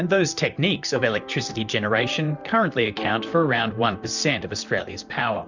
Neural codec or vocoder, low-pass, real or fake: vocoder, 44.1 kHz, 128 mel bands, Pupu-Vocoder; 7.2 kHz; fake